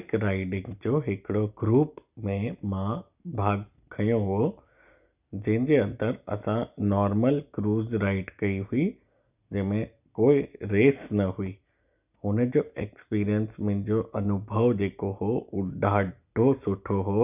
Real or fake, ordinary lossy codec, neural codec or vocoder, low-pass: real; none; none; 3.6 kHz